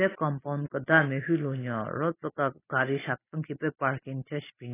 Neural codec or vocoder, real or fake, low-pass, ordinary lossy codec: none; real; 3.6 kHz; AAC, 16 kbps